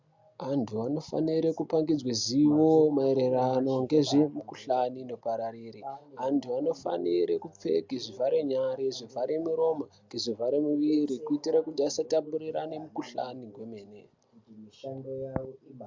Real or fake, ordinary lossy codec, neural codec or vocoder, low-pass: real; MP3, 64 kbps; none; 7.2 kHz